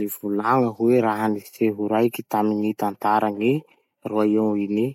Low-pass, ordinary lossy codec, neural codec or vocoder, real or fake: 19.8 kHz; MP3, 64 kbps; none; real